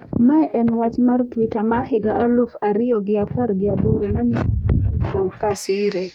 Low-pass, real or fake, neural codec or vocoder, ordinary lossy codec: 19.8 kHz; fake; codec, 44.1 kHz, 2.6 kbps, DAC; none